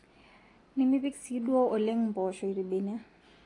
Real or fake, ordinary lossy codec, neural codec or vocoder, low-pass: real; AAC, 32 kbps; none; 10.8 kHz